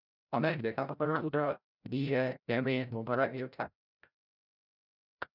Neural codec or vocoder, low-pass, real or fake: codec, 16 kHz, 0.5 kbps, FreqCodec, larger model; 5.4 kHz; fake